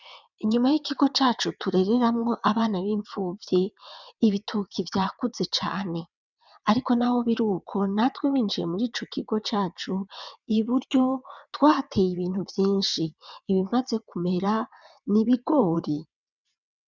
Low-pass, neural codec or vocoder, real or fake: 7.2 kHz; vocoder, 22.05 kHz, 80 mel bands, WaveNeXt; fake